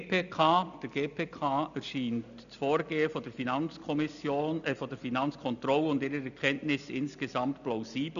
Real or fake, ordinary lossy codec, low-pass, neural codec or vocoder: real; none; 7.2 kHz; none